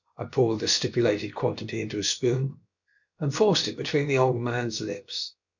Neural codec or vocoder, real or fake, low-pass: codec, 16 kHz, about 1 kbps, DyCAST, with the encoder's durations; fake; 7.2 kHz